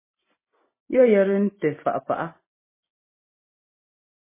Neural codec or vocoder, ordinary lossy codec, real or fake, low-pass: none; MP3, 16 kbps; real; 3.6 kHz